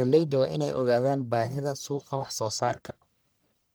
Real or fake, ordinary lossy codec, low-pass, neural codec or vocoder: fake; none; none; codec, 44.1 kHz, 1.7 kbps, Pupu-Codec